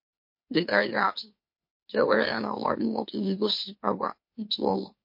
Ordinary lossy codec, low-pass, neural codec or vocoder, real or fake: MP3, 32 kbps; 5.4 kHz; autoencoder, 44.1 kHz, a latent of 192 numbers a frame, MeloTTS; fake